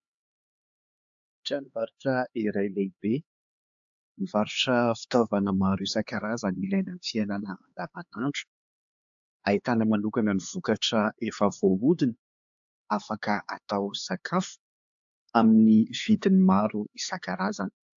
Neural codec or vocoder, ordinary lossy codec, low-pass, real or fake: codec, 16 kHz, 4 kbps, X-Codec, HuBERT features, trained on LibriSpeech; AAC, 64 kbps; 7.2 kHz; fake